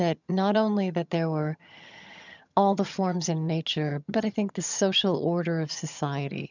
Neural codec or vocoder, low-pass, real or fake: vocoder, 22.05 kHz, 80 mel bands, HiFi-GAN; 7.2 kHz; fake